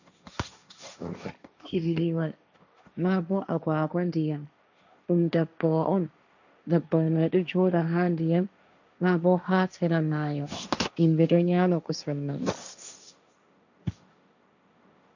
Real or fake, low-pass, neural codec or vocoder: fake; 7.2 kHz; codec, 16 kHz, 1.1 kbps, Voila-Tokenizer